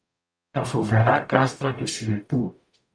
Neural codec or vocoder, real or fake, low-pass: codec, 44.1 kHz, 0.9 kbps, DAC; fake; 9.9 kHz